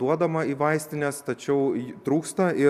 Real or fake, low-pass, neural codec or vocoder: real; 14.4 kHz; none